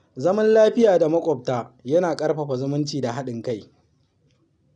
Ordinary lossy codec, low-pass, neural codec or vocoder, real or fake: none; 9.9 kHz; none; real